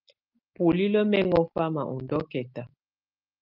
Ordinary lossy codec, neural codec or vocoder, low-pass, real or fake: Opus, 24 kbps; none; 5.4 kHz; real